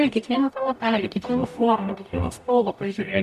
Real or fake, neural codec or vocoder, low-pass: fake; codec, 44.1 kHz, 0.9 kbps, DAC; 14.4 kHz